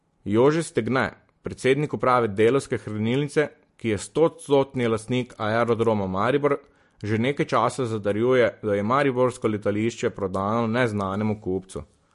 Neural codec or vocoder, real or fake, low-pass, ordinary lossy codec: none; real; 14.4 kHz; MP3, 48 kbps